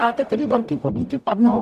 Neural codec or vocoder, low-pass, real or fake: codec, 44.1 kHz, 0.9 kbps, DAC; 14.4 kHz; fake